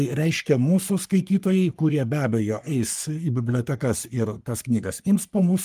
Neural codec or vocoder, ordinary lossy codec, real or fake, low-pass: codec, 44.1 kHz, 3.4 kbps, Pupu-Codec; Opus, 32 kbps; fake; 14.4 kHz